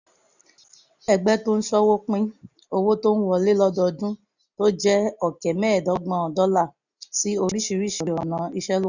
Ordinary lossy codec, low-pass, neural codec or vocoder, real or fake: none; 7.2 kHz; none; real